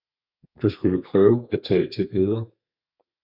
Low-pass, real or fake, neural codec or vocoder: 5.4 kHz; fake; codec, 32 kHz, 1.9 kbps, SNAC